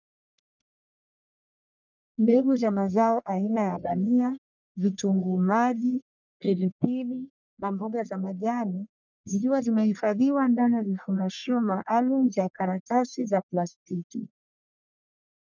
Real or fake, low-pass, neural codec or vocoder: fake; 7.2 kHz; codec, 44.1 kHz, 1.7 kbps, Pupu-Codec